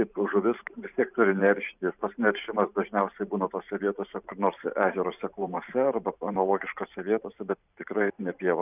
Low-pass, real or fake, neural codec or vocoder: 3.6 kHz; fake; vocoder, 44.1 kHz, 128 mel bands every 512 samples, BigVGAN v2